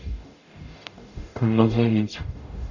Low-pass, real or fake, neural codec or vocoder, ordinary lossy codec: 7.2 kHz; fake; codec, 44.1 kHz, 0.9 kbps, DAC; none